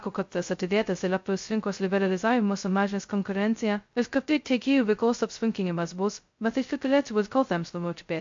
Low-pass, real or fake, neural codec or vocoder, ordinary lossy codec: 7.2 kHz; fake; codec, 16 kHz, 0.2 kbps, FocalCodec; MP3, 48 kbps